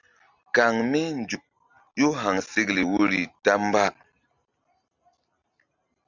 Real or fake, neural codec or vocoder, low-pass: real; none; 7.2 kHz